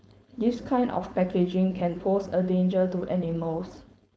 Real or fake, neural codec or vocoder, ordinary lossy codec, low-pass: fake; codec, 16 kHz, 4.8 kbps, FACodec; none; none